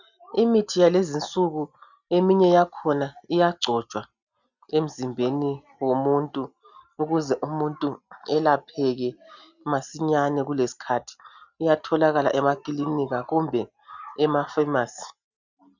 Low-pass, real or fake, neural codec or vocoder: 7.2 kHz; real; none